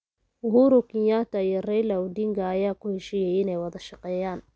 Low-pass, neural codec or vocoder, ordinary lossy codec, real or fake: none; none; none; real